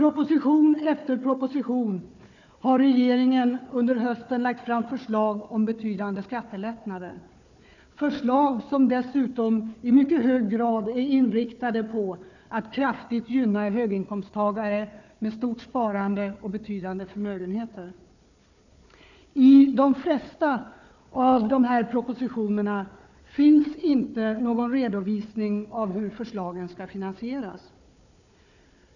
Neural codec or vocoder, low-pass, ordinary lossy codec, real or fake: codec, 16 kHz, 4 kbps, FunCodec, trained on Chinese and English, 50 frames a second; 7.2 kHz; none; fake